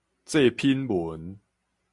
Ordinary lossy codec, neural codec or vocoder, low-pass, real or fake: MP3, 96 kbps; none; 10.8 kHz; real